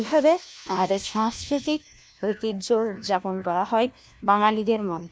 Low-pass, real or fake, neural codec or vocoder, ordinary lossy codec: none; fake; codec, 16 kHz, 1 kbps, FunCodec, trained on LibriTTS, 50 frames a second; none